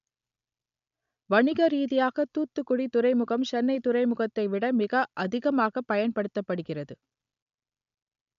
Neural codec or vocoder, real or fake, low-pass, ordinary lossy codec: none; real; 7.2 kHz; none